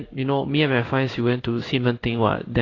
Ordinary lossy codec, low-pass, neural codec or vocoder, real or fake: AAC, 32 kbps; 7.2 kHz; codec, 16 kHz in and 24 kHz out, 1 kbps, XY-Tokenizer; fake